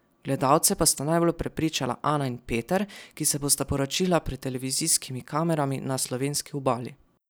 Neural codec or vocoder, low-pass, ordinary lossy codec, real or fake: none; none; none; real